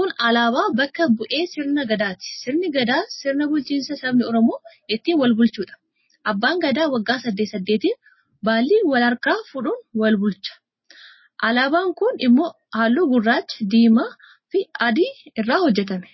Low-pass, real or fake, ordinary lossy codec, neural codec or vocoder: 7.2 kHz; real; MP3, 24 kbps; none